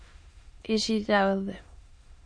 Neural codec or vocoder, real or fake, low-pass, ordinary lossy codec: autoencoder, 22.05 kHz, a latent of 192 numbers a frame, VITS, trained on many speakers; fake; 9.9 kHz; MP3, 48 kbps